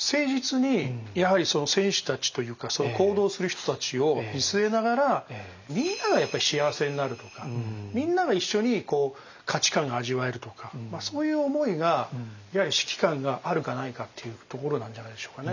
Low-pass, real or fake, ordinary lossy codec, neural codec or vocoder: 7.2 kHz; real; none; none